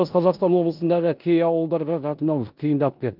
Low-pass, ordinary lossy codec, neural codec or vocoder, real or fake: 5.4 kHz; Opus, 32 kbps; codec, 16 kHz in and 24 kHz out, 0.9 kbps, LongCat-Audio-Codec, four codebook decoder; fake